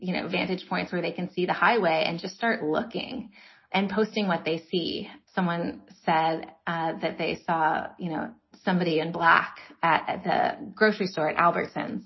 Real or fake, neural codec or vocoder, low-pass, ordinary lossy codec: real; none; 7.2 kHz; MP3, 24 kbps